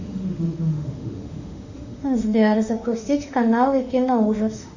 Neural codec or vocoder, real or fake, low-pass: autoencoder, 48 kHz, 32 numbers a frame, DAC-VAE, trained on Japanese speech; fake; 7.2 kHz